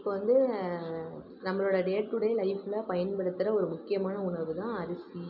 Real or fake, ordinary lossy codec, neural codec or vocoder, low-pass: real; none; none; 5.4 kHz